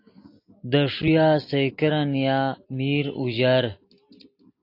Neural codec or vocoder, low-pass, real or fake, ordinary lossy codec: none; 5.4 kHz; real; AAC, 32 kbps